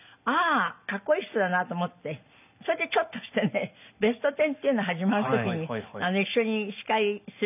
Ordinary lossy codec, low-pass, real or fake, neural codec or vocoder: none; 3.6 kHz; real; none